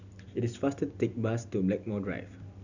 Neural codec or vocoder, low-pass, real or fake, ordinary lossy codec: none; 7.2 kHz; real; none